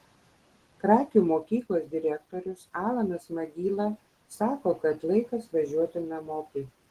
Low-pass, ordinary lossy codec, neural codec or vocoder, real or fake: 14.4 kHz; Opus, 16 kbps; none; real